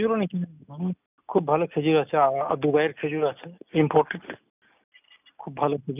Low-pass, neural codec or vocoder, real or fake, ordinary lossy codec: 3.6 kHz; none; real; none